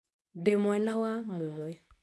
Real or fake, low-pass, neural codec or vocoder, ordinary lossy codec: fake; none; codec, 24 kHz, 0.9 kbps, WavTokenizer, medium speech release version 2; none